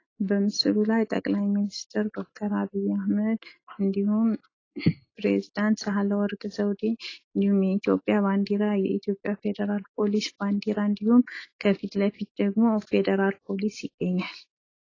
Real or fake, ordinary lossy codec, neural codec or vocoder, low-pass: real; AAC, 32 kbps; none; 7.2 kHz